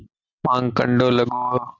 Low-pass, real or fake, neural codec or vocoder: 7.2 kHz; real; none